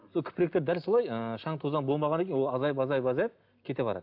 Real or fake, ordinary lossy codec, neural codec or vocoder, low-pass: real; AAC, 48 kbps; none; 5.4 kHz